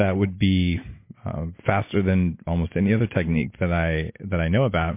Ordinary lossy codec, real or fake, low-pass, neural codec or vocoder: MP3, 24 kbps; real; 3.6 kHz; none